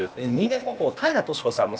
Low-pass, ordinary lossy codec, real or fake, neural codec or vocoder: none; none; fake; codec, 16 kHz, 0.8 kbps, ZipCodec